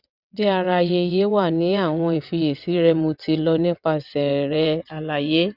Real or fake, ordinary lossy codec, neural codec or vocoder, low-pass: fake; none; vocoder, 22.05 kHz, 80 mel bands, WaveNeXt; 5.4 kHz